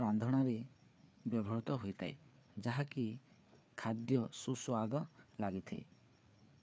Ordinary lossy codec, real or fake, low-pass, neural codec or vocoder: none; fake; none; codec, 16 kHz, 4 kbps, FreqCodec, larger model